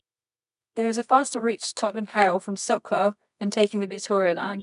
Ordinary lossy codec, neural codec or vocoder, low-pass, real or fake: none; codec, 24 kHz, 0.9 kbps, WavTokenizer, medium music audio release; 10.8 kHz; fake